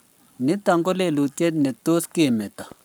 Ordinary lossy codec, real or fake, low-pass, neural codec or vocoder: none; fake; none; codec, 44.1 kHz, 7.8 kbps, Pupu-Codec